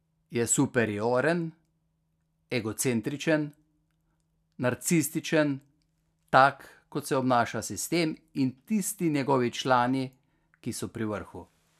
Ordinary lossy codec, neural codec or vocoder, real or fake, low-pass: none; none; real; 14.4 kHz